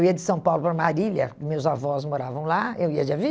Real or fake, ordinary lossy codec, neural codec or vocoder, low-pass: real; none; none; none